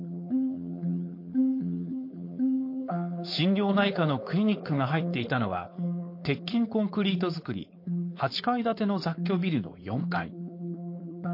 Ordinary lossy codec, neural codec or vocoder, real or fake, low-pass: MP3, 32 kbps; codec, 16 kHz, 4.8 kbps, FACodec; fake; 5.4 kHz